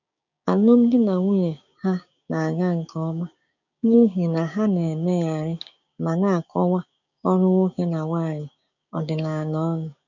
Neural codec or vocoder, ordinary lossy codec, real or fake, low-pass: codec, 16 kHz, 6 kbps, DAC; none; fake; 7.2 kHz